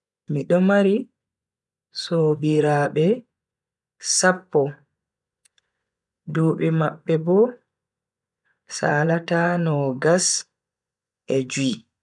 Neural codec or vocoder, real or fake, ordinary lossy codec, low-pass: vocoder, 24 kHz, 100 mel bands, Vocos; fake; none; 10.8 kHz